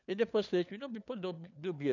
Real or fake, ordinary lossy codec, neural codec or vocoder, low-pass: fake; none; codec, 16 kHz, 4 kbps, FunCodec, trained on LibriTTS, 50 frames a second; 7.2 kHz